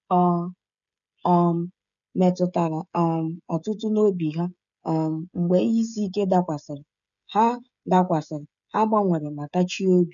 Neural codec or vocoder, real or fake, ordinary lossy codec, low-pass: codec, 16 kHz, 16 kbps, FreqCodec, smaller model; fake; none; 7.2 kHz